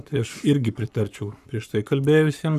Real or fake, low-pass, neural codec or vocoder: fake; 14.4 kHz; codec, 44.1 kHz, 7.8 kbps, Pupu-Codec